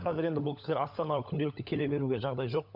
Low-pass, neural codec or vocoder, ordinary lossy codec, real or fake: 5.4 kHz; codec, 16 kHz, 16 kbps, FunCodec, trained on LibriTTS, 50 frames a second; none; fake